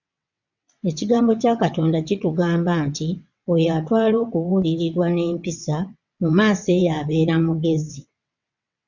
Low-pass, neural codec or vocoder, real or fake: 7.2 kHz; vocoder, 22.05 kHz, 80 mel bands, WaveNeXt; fake